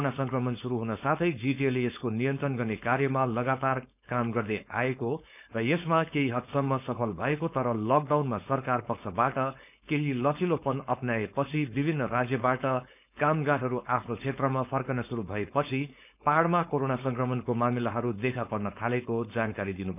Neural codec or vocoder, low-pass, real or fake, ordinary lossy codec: codec, 16 kHz, 4.8 kbps, FACodec; 3.6 kHz; fake; none